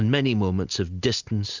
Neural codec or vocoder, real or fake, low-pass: none; real; 7.2 kHz